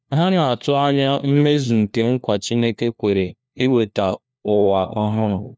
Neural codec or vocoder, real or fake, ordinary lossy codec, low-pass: codec, 16 kHz, 1 kbps, FunCodec, trained on LibriTTS, 50 frames a second; fake; none; none